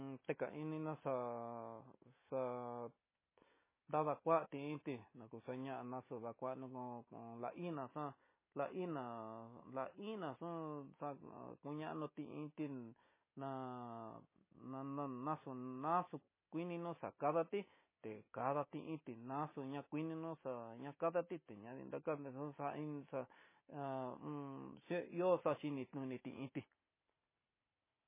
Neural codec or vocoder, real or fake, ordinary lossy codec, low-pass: none; real; MP3, 16 kbps; 3.6 kHz